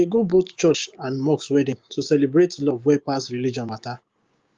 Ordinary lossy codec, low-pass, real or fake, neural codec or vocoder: Opus, 32 kbps; 7.2 kHz; fake; codec, 16 kHz, 6 kbps, DAC